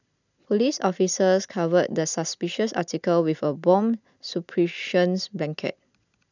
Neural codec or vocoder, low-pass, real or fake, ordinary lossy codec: none; 7.2 kHz; real; none